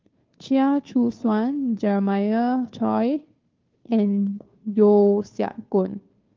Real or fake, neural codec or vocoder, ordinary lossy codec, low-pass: fake; codec, 16 kHz, 2 kbps, FunCodec, trained on Chinese and English, 25 frames a second; Opus, 24 kbps; 7.2 kHz